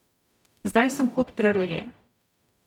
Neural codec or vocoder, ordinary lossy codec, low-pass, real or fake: codec, 44.1 kHz, 0.9 kbps, DAC; none; 19.8 kHz; fake